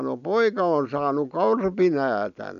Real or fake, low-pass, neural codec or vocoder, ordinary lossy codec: real; 7.2 kHz; none; none